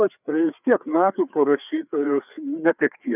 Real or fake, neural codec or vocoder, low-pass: fake; codec, 16 kHz, 4 kbps, FreqCodec, larger model; 3.6 kHz